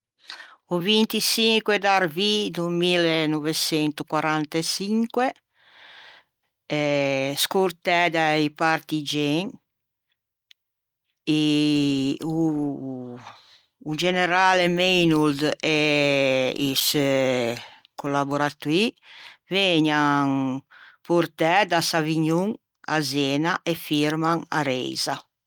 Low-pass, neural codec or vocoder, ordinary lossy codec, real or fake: 19.8 kHz; none; Opus, 32 kbps; real